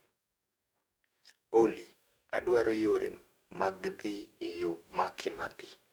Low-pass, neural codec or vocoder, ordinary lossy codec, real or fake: none; codec, 44.1 kHz, 2.6 kbps, DAC; none; fake